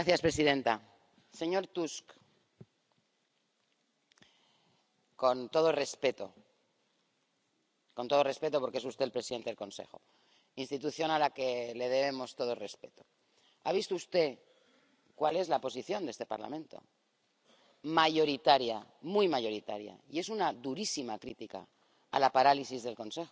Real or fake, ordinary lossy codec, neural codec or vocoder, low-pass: real; none; none; none